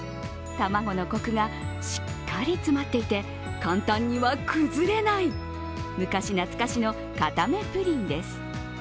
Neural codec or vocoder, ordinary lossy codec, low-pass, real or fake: none; none; none; real